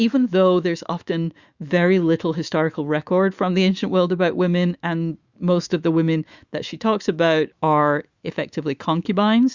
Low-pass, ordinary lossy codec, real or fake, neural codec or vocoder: 7.2 kHz; Opus, 64 kbps; fake; autoencoder, 48 kHz, 128 numbers a frame, DAC-VAE, trained on Japanese speech